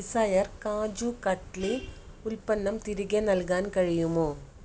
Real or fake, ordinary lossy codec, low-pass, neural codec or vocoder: real; none; none; none